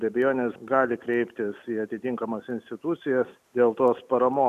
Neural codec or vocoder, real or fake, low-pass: none; real; 14.4 kHz